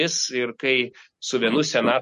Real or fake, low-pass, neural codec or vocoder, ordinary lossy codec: real; 14.4 kHz; none; MP3, 48 kbps